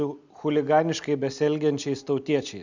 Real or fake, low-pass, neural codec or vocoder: real; 7.2 kHz; none